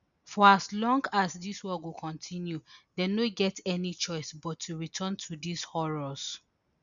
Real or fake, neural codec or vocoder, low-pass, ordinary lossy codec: real; none; 7.2 kHz; none